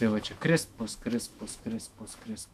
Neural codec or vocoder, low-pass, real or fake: autoencoder, 48 kHz, 128 numbers a frame, DAC-VAE, trained on Japanese speech; 14.4 kHz; fake